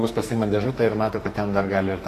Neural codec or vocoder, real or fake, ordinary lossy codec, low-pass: codec, 44.1 kHz, 2.6 kbps, SNAC; fake; AAC, 48 kbps; 14.4 kHz